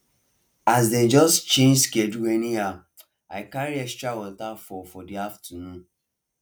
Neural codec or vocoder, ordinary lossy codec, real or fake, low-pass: none; none; real; none